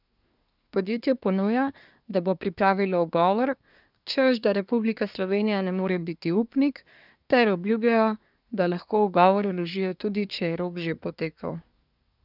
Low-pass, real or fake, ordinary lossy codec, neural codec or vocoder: 5.4 kHz; fake; none; codec, 24 kHz, 1 kbps, SNAC